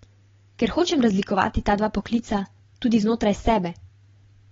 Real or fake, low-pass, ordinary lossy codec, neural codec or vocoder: real; 7.2 kHz; AAC, 24 kbps; none